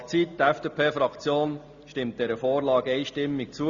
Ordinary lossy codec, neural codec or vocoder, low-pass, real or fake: Opus, 64 kbps; none; 7.2 kHz; real